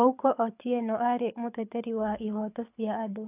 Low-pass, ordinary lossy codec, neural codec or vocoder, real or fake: 3.6 kHz; AAC, 32 kbps; codec, 24 kHz, 6 kbps, HILCodec; fake